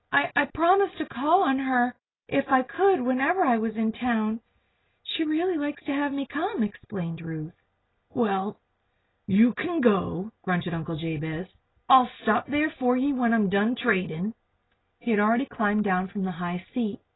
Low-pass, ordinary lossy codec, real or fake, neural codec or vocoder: 7.2 kHz; AAC, 16 kbps; real; none